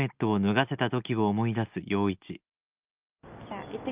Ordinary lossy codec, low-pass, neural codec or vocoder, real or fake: Opus, 32 kbps; 3.6 kHz; none; real